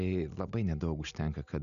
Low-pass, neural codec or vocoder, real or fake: 7.2 kHz; none; real